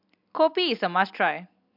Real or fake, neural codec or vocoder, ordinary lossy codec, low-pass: real; none; MP3, 48 kbps; 5.4 kHz